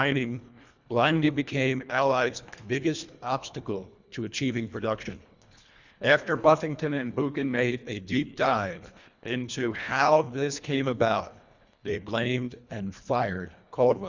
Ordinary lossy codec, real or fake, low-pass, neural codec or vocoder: Opus, 64 kbps; fake; 7.2 kHz; codec, 24 kHz, 1.5 kbps, HILCodec